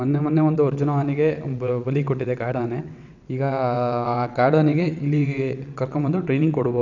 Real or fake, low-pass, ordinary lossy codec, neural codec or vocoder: fake; 7.2 kHz; none; vocoder, 22.05 kHz, 80 mel bands, WaveNeXt